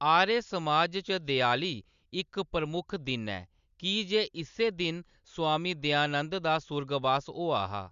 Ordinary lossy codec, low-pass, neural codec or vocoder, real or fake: Opus, 64 kbps; 7.2 kHz; none; real